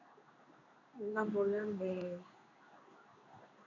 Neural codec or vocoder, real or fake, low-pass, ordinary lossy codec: codec, 16 kHz in and 24 kHz out, 1 kbps, XY-Tokenizer; fake; 7.2 kHz; MP3, 64 kbps